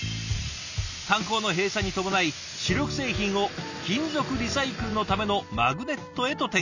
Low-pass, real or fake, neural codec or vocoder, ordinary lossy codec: 7.2 kHz; real; none; none